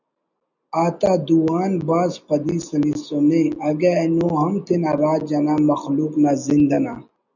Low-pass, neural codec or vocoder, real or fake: 7.2 kHz; none; real